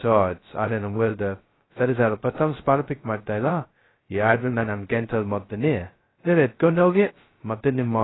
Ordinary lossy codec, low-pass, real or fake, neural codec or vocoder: AAC, 16 kbps; 7.2 kHz; fake; codec, 16 kHz, 0.2 kbps, FocalCodec